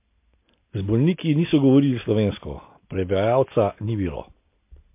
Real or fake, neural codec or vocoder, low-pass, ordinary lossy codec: real; none; 3.6 kHz; MP3, 24 kbps